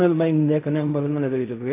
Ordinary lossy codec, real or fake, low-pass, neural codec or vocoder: AAC, 24 kbps; fake; 3.6 kHz; codec, 16 kHz in and 24 kHz out, 0.4 kbps, LongCat-Audio-Codec, fine tuned four codebook decoder